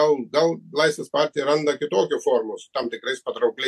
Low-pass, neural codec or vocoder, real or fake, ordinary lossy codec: 14.4 kHz; none; real; MP3, 64 kbps